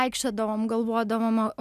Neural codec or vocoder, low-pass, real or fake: none; 14.4 kHz; real